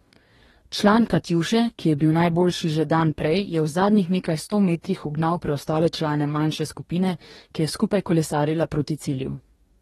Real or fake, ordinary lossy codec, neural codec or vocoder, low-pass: fake; AAC, 32 kbps; codec, 44.1 kHz, 2.6 kbps, DAC; 19.8 kHz